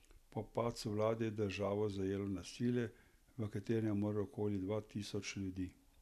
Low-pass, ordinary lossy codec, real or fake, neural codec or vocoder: 14.4 kHz; none; real; none